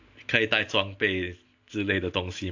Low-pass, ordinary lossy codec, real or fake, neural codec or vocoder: 7.2 kHz; AAC, 48 kbps; real; none